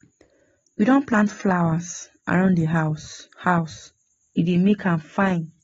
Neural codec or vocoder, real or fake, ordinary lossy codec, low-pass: none; real; AAC, 24 kbps; 7.2 kHz